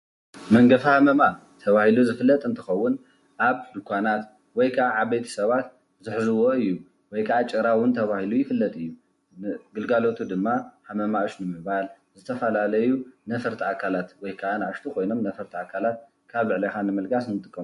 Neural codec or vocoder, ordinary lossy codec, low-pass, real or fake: none; MP3, 48 kbps; 14.4 kHz; real